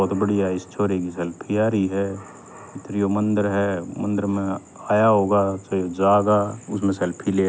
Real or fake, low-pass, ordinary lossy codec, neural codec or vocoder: real; none; none; none